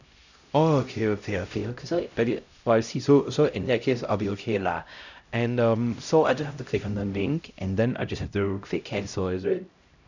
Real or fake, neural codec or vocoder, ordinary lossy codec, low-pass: fake; codec, 16 kHz, 0.5 kbps, X-Codec, HuBERT features, trained on LibriSpeech; none; 7.2 kHz